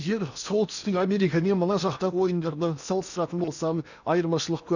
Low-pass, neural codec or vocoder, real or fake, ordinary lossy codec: 7.2 kHz; codec, 16 kHz in and 24 kHz out, 0.8 kbps, FocalCodec, streaming, 65536 codes; fake; none